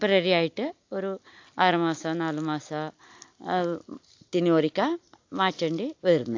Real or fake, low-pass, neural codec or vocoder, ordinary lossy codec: real; 7.2 kHz; none; AAC, 48 kbps